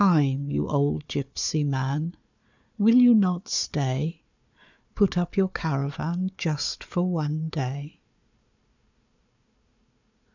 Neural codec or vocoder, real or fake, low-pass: codec, 16 kHz, 4 kbps, FunCodec, trained on Chinese and English, 50 frames a second; fake; 7.2 kHz